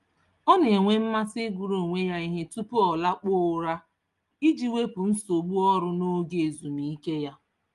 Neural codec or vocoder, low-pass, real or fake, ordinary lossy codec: none; 10.8 kHz; real; Opus, 32 kbps